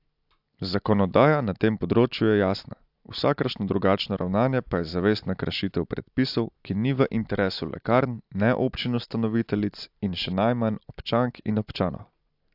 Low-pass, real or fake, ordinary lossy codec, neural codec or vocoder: 5.4 kHz; real; AAC, 48 kbps; none